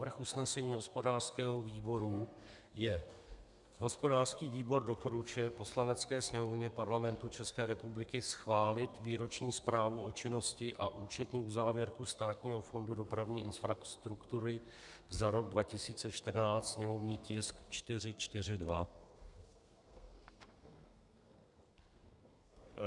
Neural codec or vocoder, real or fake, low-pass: codec, 32 kHz, 1.9 kbps, SNAC; fake; 10.8 kHz